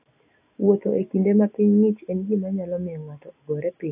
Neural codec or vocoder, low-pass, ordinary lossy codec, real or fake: none; 3.6 kHz; AAC, 24 kbps; real